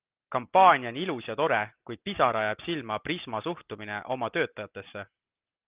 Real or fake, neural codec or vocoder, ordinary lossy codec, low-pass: real; none; Opus, 32 kbps; 3.6 kHz